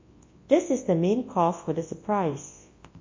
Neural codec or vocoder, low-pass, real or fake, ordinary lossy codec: codec, 24 kHz, 0.9 kbps, WavTokenizer, large speech release; 7.2 kHz; fake; MP3, 32 kbps